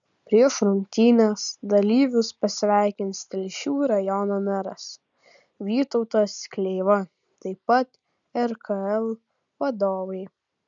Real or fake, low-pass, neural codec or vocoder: real; 7.2 kHz; none